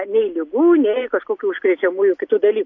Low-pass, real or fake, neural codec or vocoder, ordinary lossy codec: 7.2 kHz; real; none; AAC, 48 kbps